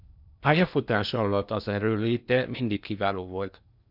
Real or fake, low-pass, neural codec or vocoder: fake; 5.4 kHz; codec, 16 kHz in and 24 kHz out, 0.8 kbps, FocalCodec, streaming, 65536 codes